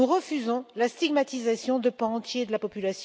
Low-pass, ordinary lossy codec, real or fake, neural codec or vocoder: none; none; real; none